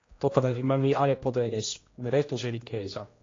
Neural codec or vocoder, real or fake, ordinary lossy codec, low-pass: codec, 16 kHz, 1 kbps, X-Codec, HuBERT features, trained on general audio; fake; AAC, 32 kbps; 7.2 kHz